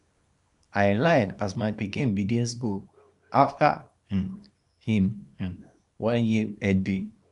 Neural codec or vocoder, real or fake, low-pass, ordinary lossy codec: codec, 24 kHz, 0.9 kbps, WavTokenizer, small release; fake; 10.8 kHz; none